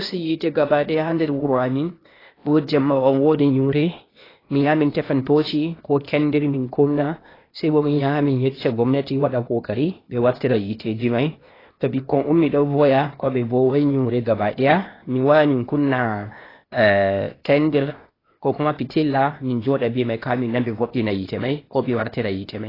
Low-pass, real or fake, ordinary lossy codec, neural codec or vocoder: 5.4 kHz; fake; AAC, 24 kbps; codec, 16 kHz, 0.8 kbps, ZipCodec